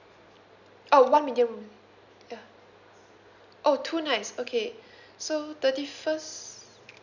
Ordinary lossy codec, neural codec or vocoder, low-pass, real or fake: none; none; 7.2 kHz; real